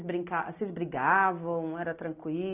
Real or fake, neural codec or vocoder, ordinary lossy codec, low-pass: real; none; none; 3.6 kHz